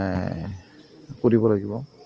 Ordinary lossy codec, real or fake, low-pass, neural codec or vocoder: Opus, 24 kbps; real; 7.2 kHz; none